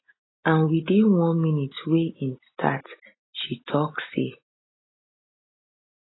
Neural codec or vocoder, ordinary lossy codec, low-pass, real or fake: none; AAC, 16 kbps; 7.2 kHz; real